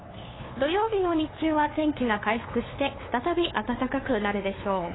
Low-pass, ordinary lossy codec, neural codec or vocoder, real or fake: 7.2 kHz; AAC, 16 kbps; codec, 16 kHz, 2 kbps, FunCodec, trained on LibriTTS, 25 frames a second; fake